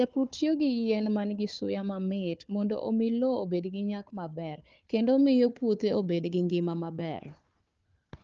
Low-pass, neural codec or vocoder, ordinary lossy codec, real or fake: 7.2 kHz; codec, 16 kHz, 4 kbps, FunCodec, trained on Chinese and English, 50 frames a second; Opus, 24 kbps; fake